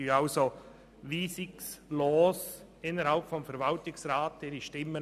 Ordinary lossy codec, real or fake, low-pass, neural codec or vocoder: none; real; 14.4 kHz; none